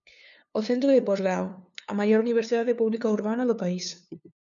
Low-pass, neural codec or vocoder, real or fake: 7.2 kHz; codec, 16 kHz, 2 kbps, FunCodec, trained on LibriTTS, 25 frames a second; fake